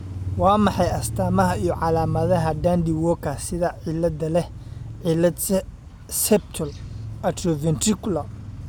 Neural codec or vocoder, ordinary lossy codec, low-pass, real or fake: none; none; none; real